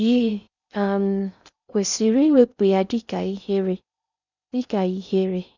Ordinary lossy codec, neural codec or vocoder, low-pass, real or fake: none; codec, 16 kHz in and 24 kHz out, 0.6 kbps, FocalCodec, streaming, 4096 codes; 7.2 kHz; fake